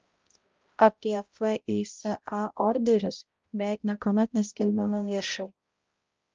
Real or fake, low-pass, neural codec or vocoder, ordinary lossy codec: fake; 7.2 kHz; codec, 16 kHz, 0.5 kbps, X-Codec, HuBERT features, trained on balanced general audio; Opus, 24 kbps